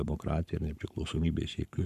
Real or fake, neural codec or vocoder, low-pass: fake; codec, 44.1 kHz, 7.8 kbps, Pupu-Codec; 14.4 kHz